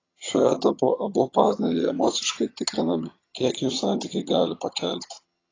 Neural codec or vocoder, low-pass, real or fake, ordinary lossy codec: vocoder, 22.05 kHz, 80 mel bands, HiFi-GAN; 7.2 kHz; fake; AAC, 32 kbps